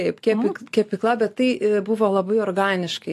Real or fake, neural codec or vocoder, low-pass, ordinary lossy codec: real; none; 14.4 kHz; AAC, 64 kbps